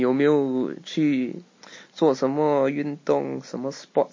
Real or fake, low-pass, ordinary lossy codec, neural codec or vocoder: real; 7.2 kHz; MP3, 32 kbps; none